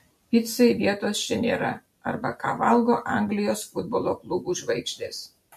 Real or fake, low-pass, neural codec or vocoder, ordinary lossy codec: real; 14.4 kHz; none; MP3, 64 kbps